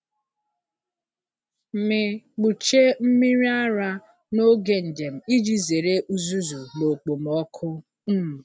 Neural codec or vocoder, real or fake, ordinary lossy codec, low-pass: none; real; none; none